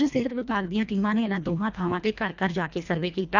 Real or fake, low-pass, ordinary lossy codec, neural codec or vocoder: fake; 7.2 kHz; none; codec, 24 kHz, 1.5 kbps, HILCodec